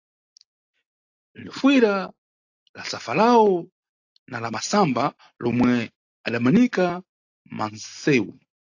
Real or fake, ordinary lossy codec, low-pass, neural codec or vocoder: real; AAC, 48 kbps; 7.2 kHz; none